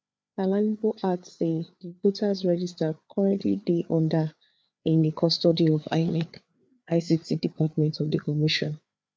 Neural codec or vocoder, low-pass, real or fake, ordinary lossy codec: codec, 16 kHz, 4 kbps, FreqCodec, larger model; none; fake; none